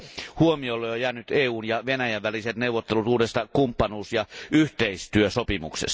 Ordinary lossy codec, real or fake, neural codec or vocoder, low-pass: none; real; none; none